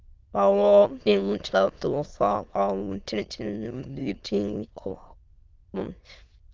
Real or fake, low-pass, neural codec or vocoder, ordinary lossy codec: fake; 7.2 kHz; autoencoder, 22.05 kHz, a latent of 192 numbers a frame, VITS, trained on many speakers; Opus, 32 kbps